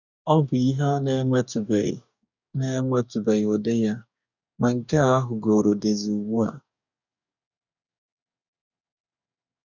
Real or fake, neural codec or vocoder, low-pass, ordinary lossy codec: fake; codec, 44.1 kHz, 2.6 kbps, DAC; 7.2 kHz; none